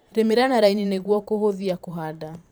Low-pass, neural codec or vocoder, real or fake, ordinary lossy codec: none; vocoder, 44.1 kHz, 128 mel bands every 512 samples, BigVGAN v2; fake; none